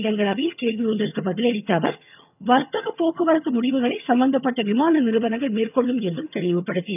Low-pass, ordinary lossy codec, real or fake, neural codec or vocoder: 3.6 kHz; none; fake; vocoder, 22.05 kHz, 80 mel bands, HiFi-GAN